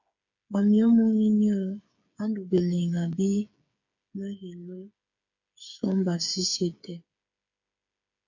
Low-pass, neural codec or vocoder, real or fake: 7.2 kHz; codec, 16 kHz, 8 kbps, FreqCodec, smaller model; fake